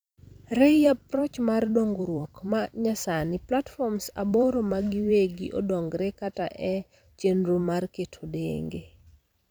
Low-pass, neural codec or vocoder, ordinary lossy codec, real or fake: none; vocoder, 44.1 kHz, 128 mel bands every 256 samples, BigVGAN v2; none; fake